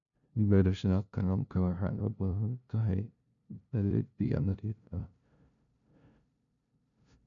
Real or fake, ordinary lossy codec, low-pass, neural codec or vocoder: fake; MP3, 96 kbps; 7.2 kHz; codec, 16 kHz, 0.5 kbps, FunCodec, trained on LibriTTS, 25 frames a second